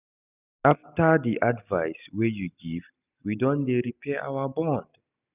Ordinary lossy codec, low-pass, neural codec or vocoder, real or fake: none; 3.6 kHz; none; real